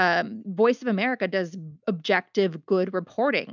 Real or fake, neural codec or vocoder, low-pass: real; none; 7.2 kHz